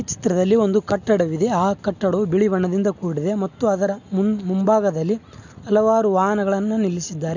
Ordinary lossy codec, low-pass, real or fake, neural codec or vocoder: none; 7.2 kHz; real; none